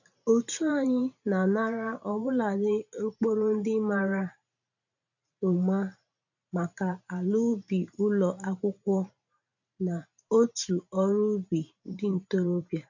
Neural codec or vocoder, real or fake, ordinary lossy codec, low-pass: vocoder, 44.1 kHz, 128 mel bands every 512 samples, BigVGAN v2; fake; none; 7.2 kHz